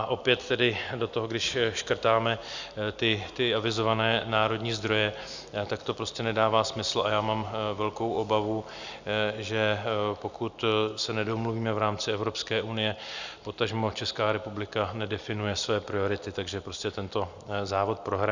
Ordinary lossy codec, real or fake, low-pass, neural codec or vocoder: Opus, 64 kbps; real; 7.2 kHz; none